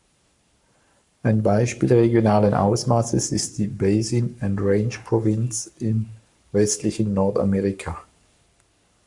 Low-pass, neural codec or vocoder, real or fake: 10.8 kHz; codec, 44.1 kHz, 7.8 kbps, Pupu-Codec; fake